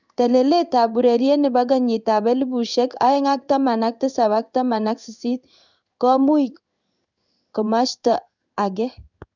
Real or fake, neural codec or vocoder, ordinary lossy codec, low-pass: fake; codec, 16 kHz in and 24 kHz out, 1 kbps, XY-Tokenizer; none; 7.2 kHz